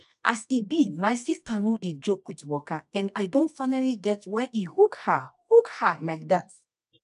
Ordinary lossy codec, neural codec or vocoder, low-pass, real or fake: none; codec, 24 kHz, 0.9 kbps, WavTokenizer, medium music audio release; 10.8 kHz; fake